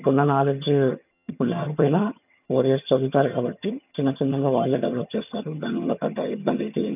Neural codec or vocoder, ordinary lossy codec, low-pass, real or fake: vocoder, 22.05 kHz, 80 mel bands, HiFi-GAN; none; 3.6 kHz; fake